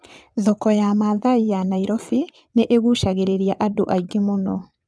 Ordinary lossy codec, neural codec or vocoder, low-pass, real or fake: none; vocoder, 22.05 kHz, 80 mel bands, WaveNeXt; none; fake